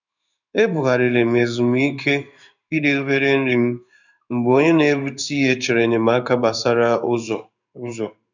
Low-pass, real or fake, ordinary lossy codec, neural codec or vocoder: 7.2 kHz; fake; none; codec, 16 kHz in and 24 kHz out, 1 kbps, XY-Tokenizer